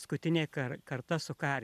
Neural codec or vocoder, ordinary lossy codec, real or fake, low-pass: none; MP3, 96 kbps; real; 14.4 kHz